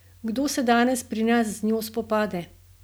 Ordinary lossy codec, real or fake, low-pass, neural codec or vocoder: none; real; none; none